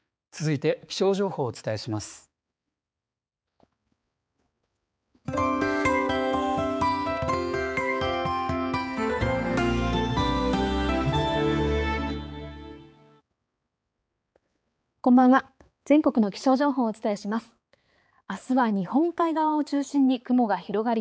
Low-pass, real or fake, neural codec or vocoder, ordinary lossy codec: none; fake; codec, 16 kHz, 4 kbps, X-Codec, HuBERT features, trained on balanced general audio; none